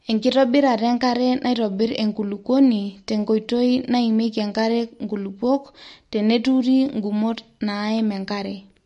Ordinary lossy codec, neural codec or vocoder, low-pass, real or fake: MP3, 48 kbps; none; 10.8 kHz; real